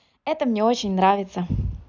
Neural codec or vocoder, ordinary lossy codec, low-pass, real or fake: none; none; 7.2 kHz; real